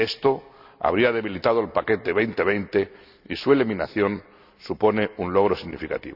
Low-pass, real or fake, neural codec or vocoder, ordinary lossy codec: 5.4 kHz; real; none; none